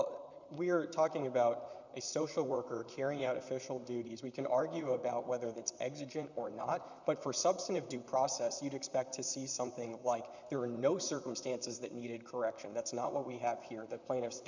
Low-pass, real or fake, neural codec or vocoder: 7.2 kHz; fake; vocoder, 44.1 kHz, 128 mel bands, Pupu-Vocoder